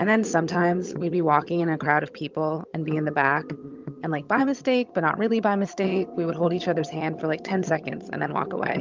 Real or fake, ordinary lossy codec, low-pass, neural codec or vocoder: fake; Opus, 24 kbps; 7.2 kHz; vocoder, 22.05 kHz, 80 mel bands, HiFi-GAN